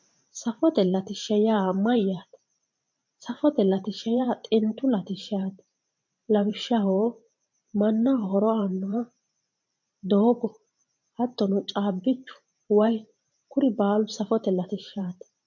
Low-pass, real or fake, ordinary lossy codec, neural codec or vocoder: 7.2 kHz; fake; MP3, 48 kbps; vocoder, 44.1 kHz, 128 mel bands every 512 samples, BigVGAN v2